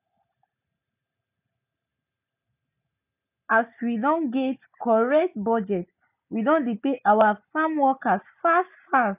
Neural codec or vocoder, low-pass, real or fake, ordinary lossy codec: none; 3.6 kHz; real; none